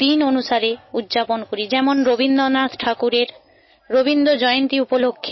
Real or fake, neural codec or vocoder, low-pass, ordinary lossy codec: real; none; 7.2 kHz; MP3, 24 kbps